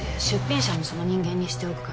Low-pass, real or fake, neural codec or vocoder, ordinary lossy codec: none; real; none; none